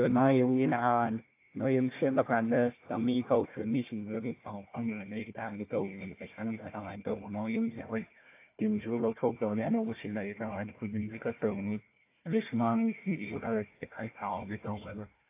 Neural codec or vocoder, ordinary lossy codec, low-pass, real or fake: codec, 16 kHz, 1 kbps, FunCodec, trained on Chinese and English, 50 frames a second; AAC, 24 kbps; 3.6 kHz; fake